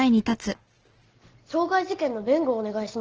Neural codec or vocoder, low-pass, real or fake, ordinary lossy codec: none; 7.2 kHz; real; Opus, 16 kbps